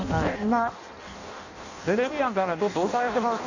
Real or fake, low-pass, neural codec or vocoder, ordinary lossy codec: fake; 7.2 kHz; codec, 16 kHz in and 24 kHz out, 0.6 kbps, FireRedTTS-2 codec; none